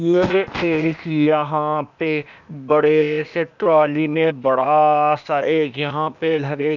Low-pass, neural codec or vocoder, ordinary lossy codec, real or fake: 7.2 kHz; codec, 16 kHz, 0.8 kbps, ZipCodec; none; fake